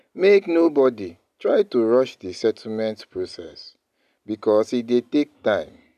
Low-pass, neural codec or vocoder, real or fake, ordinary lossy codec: 14.4 kHz; none; real; none